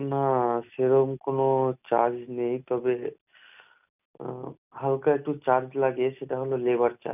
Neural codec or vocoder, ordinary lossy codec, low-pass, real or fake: none; none; 3.6 kHz; real